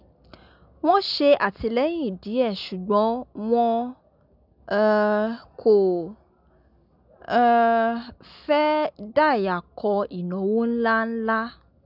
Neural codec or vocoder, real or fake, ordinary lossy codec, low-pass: none; real; none; 5.4 kHz